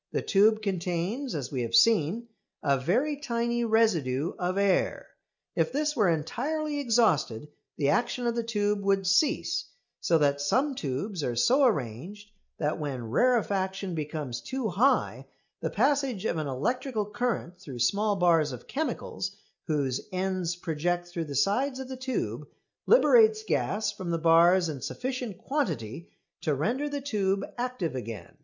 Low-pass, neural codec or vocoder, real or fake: 7.2 kHz; none; real